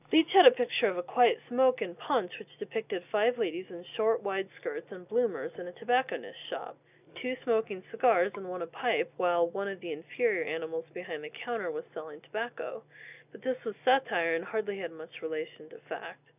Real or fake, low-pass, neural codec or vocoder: real; 3.6 kHz; none